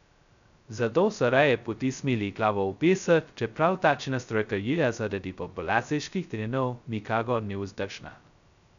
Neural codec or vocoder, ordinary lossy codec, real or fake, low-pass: codec, 16 kHz, 0.2 kbps, FocalCodec; none; fake; 7.2 kHz